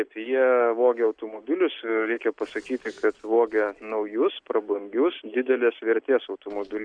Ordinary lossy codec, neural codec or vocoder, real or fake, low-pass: Opus, 64 kbps; none; real; 9.9 kHz